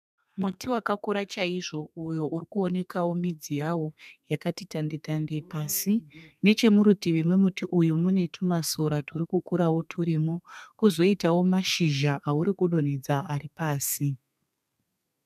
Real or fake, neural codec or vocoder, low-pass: fake; codec, 32 kHz, 1.9 kbps, SNAC; 14.4 kHz